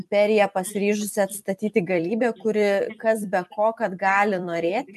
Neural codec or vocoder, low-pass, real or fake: vocoder, 44.1 kHz, 128 mel bands every 256 samples, BigVGAN v2; 14.4 kHz; fake